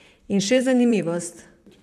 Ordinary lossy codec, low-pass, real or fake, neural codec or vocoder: none; 14.4 kHz; fake; vocoder, 44.1 kHz, 128 mel bands, Pupu-Vocoder